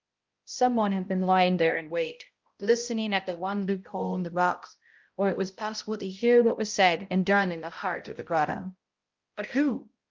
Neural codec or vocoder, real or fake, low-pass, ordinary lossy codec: codec, 16 kHz, 0.5 kbps, X-Codec, HuBERT features, trained on balanced general audio; fake; 7.2 kHz; Opus, 32 kbps